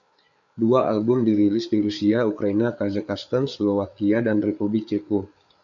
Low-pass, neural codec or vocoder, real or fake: 7.2 kHz; codec, 16 kHz, 8 kbps, FreqCodec, larger model; fake